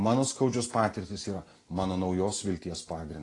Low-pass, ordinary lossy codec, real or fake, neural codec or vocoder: 10.8 kHz; AAC, 32 kbps; real; none